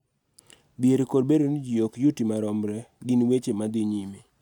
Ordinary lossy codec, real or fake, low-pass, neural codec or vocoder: none; real; 19.8 kHz; none